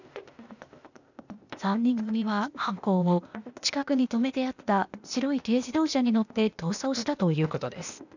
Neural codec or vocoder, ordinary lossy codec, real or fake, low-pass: codec, 16 kHz, 0.8 kbps, ZipCodec; none; fake; 7.2 kHz